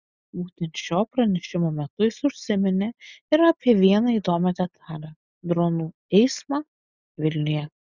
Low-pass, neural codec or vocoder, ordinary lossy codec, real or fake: 7.2 kHz; none; Opus, 64 kbps; real